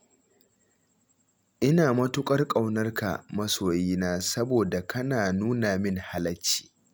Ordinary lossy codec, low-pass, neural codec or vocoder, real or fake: none; none; none; real